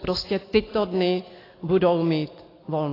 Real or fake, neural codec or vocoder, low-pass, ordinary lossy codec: real; none; 5.4 kHz; AAC, 24 kbps